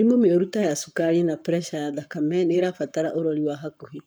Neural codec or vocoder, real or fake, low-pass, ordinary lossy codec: codec, 44.1 kHz, 7.8 kbps, Pupu-Codec; fake; none; none